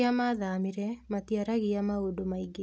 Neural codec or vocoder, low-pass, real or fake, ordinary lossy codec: none; none; real; none